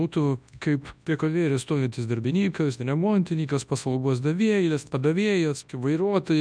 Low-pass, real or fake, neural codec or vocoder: 9.9 kHz; fake; codec, 24 kHz, 0.9 kbps, WavTokenizer, large speech release